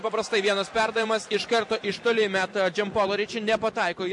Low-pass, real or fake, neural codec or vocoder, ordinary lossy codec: 10.8 kHz; fake; vocoder, 44.1 kHz, 128 mel bands every 512 samples, BigVGAN v2; MP3, 48 kbps